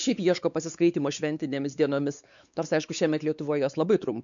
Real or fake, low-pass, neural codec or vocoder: fake; 7.2 kHz; codec, 16 kHz, 4 kbps, X-Codec, WavLM features, trained on Multilingual LibriSpeech